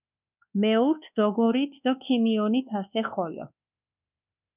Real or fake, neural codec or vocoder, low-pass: fake; codec, 24 kHz, 1.2 kbps, DualCodec; 3.6 kHz